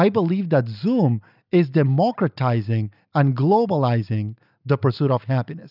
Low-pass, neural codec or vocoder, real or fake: 5.4 kHz; none; real